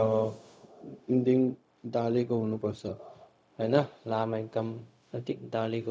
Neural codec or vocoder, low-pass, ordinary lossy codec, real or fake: codec, 16 kHz, 0.4 kbps, LongCat-Audio-Codec; none; none; fake